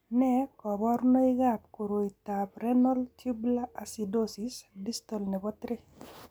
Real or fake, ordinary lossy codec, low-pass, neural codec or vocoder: real; none; none; none